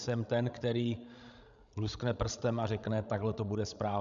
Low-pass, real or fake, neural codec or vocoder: 7.2 kHz; fake; codec, 16 kHz, 16 kbps, FreqCodec, larger model